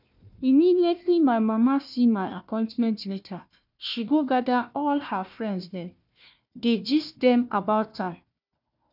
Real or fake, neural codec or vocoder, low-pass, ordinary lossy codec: fake; codec, 16 kHz, 1 kbps, FunCodec, trained on Chinese and English, 50 frames a second; 5.4 kHz; none